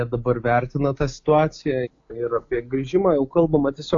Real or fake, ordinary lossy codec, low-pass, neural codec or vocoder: real; AAC, 48 kbps; 7.2 kHz; none